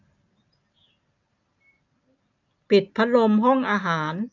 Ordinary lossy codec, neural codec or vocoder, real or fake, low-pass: none; none; real; 7.2 kHz